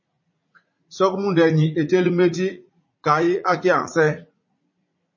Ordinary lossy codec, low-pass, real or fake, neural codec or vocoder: MP3, 32 kbps; 7.2 kHz; fake; vocoder, 44.1 kHz, 80 mel bands, Vocos